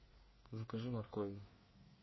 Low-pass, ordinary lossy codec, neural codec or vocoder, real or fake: 7.2 kHz; MP3, 24 kbps; codec, 24 kHz, 1 kbps, SNAC; fake